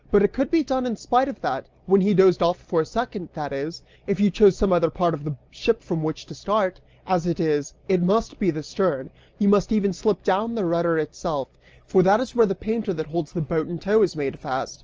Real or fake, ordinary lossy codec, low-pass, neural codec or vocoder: real; Opus, 24 kbps; 7.2 kHz; none